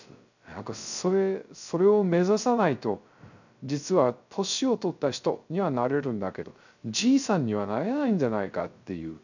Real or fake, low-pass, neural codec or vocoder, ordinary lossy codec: fake; 7.2 kHz; codec, 16 kHz, 0.3 kbps, FocalCodec; none